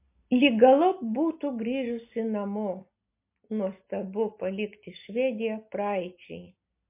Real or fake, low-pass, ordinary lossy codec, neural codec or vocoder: real; 3.6 kHz; MP3, 24 kbps; none